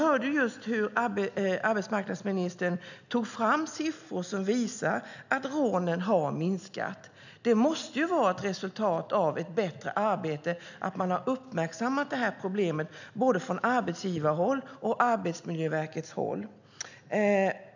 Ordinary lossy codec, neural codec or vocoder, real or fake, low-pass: none; none; real; 7.2 kHz